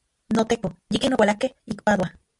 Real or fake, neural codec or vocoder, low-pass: real; none; 10.8 kHz